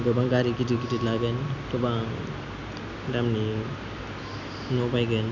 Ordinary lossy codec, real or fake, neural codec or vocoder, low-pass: none; real; none; 7.2 kHz